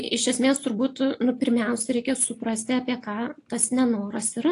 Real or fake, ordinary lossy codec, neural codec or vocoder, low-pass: real; AAC, 48 kbps; none; 10.8 kHz